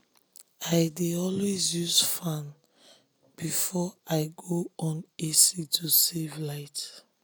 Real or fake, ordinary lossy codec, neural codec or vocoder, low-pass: real; none; none; none